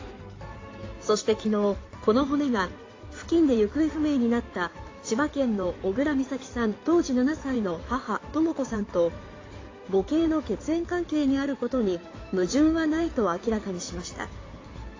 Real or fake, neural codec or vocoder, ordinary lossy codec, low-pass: fake; codec, 16 kHz in and 24 kHz out, 2.2 kbps, FireRedTTS-2 codec; AAC, 32 kbps; 7.2 kHz